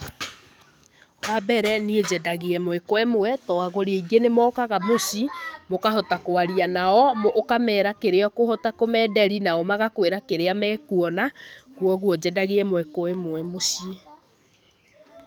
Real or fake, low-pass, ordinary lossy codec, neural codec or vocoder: fake; none; none; codec, 44.1 kHz, 7.8 kbps, DAC